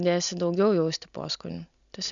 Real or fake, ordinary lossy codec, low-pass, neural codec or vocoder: real; MP3, 96 kbps; 7.2 kHz; none